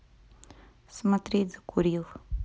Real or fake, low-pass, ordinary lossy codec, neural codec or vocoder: real; none; none; none